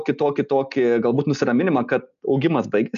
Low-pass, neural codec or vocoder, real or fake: 7.2 kHz; none; real